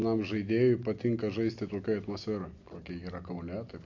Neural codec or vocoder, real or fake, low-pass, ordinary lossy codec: none; real; 7.2 kHz; AAC, 48 kbps